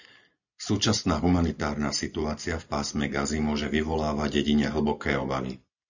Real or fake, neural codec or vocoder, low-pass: real; none; 7.2 kHz